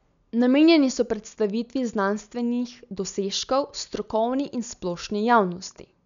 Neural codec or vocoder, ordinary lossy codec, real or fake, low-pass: none; none; real; 7.2 kHz